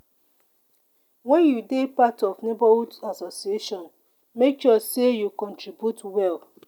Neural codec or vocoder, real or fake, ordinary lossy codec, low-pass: none; real; none; 19.8 kHz